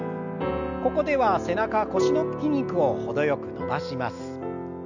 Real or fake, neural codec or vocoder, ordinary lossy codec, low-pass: real; none; none; 7.2 kHz